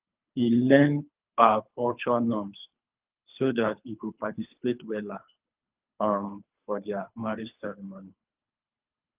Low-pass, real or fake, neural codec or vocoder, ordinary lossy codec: 3.6 kHz; fake; codec, 24 kHz, 3 kbps, HILCodec; Opus, 32 kbps